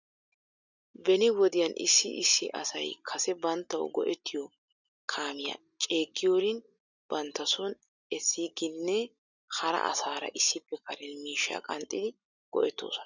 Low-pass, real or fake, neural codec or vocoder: 7.2 kHz; real; none